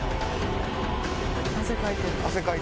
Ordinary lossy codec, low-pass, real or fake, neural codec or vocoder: none; none; real; none